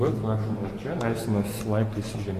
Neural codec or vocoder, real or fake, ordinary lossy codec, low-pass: vocoder, 44.1 kHz, 128 mel bands every 512 samples, BigVGAN v2; fake; AAC, 48 kbps; 14.4 kHz